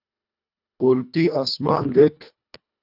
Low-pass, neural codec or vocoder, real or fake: 5.4 kHz; codec, 24 kHz, 1.5 kbps, HILCodec; fake